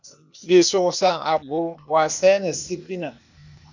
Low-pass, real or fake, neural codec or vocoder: 7.2 kHz; fake; codec, 16 kHz, 0.8 kbps, ZipCodec